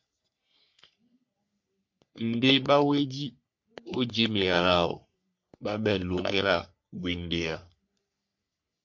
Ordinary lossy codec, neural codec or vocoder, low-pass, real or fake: MP3, 48 kbps; codec, 44.1 kHz, 3.4 kbps, Pupu-Codec; 7.2 kHz; fake